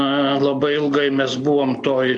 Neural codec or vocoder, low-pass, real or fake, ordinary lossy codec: none; 9.9 kHz; real; AAC, 64 kbps